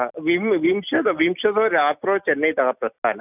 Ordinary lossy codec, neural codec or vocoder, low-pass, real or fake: none; none; 3.6 kHz; real